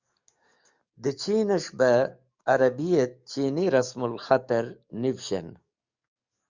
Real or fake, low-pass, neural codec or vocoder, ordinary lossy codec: fake; 7.2 kHz; codec, 44.1 kHz, 7.8 kbps, DAC; Opus, 64 kbps